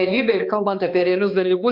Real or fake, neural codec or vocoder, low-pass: fake; codec, 16 kHz, 2 kbps, X-Codec, HuBERT features, trained on balanced general audio; 5.4 kHz